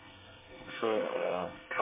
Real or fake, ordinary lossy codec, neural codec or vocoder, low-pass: fake; MP3, 16 kbps; codec, 24 kHz, 1 kbps, SNAC; 3.6 kHz